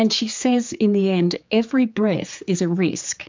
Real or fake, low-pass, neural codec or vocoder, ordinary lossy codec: fake; 7.2 kHz; codec, 16 kHz, 4 kbps, X-Codec, HuBERT features, trained on general audio; MP3, 64 kbps